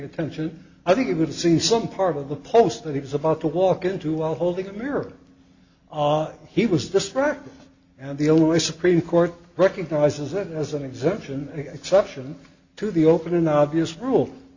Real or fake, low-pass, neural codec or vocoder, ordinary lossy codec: real; 7.2 kHz; none; Opus, 64 kbps